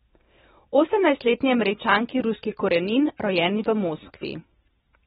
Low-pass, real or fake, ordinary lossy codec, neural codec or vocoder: 19.8 kHz; real; AAC, 16 kbps; none